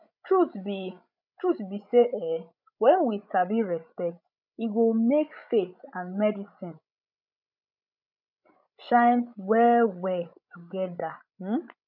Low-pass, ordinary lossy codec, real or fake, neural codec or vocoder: 5.4 kHz; none; fake; codec, 16 kHz, 16 kbps, FreqCodec, larger model